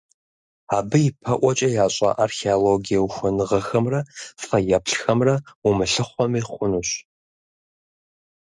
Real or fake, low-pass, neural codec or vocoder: real; 10.8 kHz; none